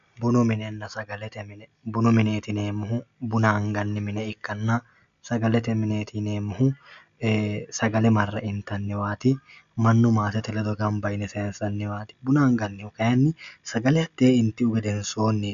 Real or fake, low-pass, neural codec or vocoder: real; 7.2 kHz; none